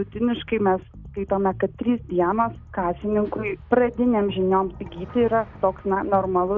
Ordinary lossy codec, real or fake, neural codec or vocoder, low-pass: Opus, 64 kbps; real; none; 7.2 kHz